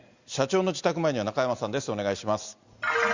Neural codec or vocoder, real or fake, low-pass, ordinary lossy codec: none; real; 7.2 kHz; Opus, 64 kbps